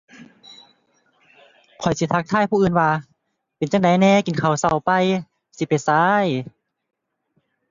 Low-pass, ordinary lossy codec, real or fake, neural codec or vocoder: 7.2 kHz; Opus, 64 kbps; real; none